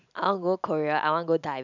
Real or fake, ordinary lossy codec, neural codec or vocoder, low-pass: real; none; none; 7.2 kHz